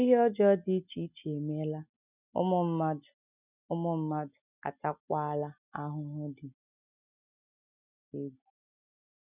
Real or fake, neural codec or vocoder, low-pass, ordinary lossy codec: real; none; 3.6 kHz; none